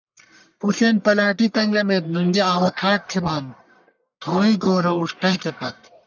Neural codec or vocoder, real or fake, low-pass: codec, 44.1 kHz, 1.7 kbps, Pupu-Codec; fake; 7.2 kHz